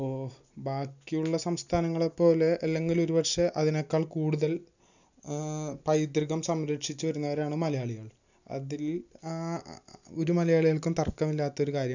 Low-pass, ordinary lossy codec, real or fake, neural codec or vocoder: 7.2 kHz; none; real; none